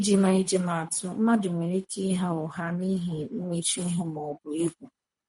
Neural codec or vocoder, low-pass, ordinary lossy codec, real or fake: codec, 24 kHz, 3 kbps, HILCodec; 10.8 kHz; MP3, 48 kbps; fake